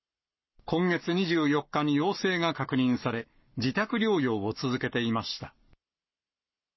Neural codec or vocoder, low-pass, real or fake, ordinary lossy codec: codec, 44.1 kHz, 7.8 kbps, Pupu-Codec; 7.2 kHz; fake; MP3, 24 kbps